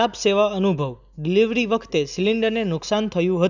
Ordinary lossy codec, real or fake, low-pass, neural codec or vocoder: none; real; 7.2 kHz; none